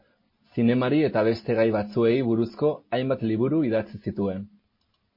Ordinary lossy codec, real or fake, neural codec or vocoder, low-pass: MP3, 24 kbps; real; none; 5.4 kHz